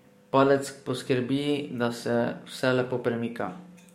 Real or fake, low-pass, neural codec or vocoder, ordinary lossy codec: fake; 19.8 kHz; codec, 44.1 kHz, 7.8 kbps, DAC; MP3, 64 kbps